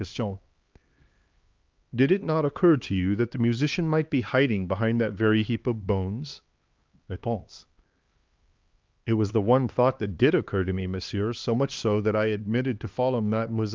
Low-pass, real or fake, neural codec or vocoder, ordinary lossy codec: 7.2 kHz; fake; codec, 16 kHz, 2 kbps, X-Codec, HuBERT features, trained on LibriSpeech; Opus, 24 kbps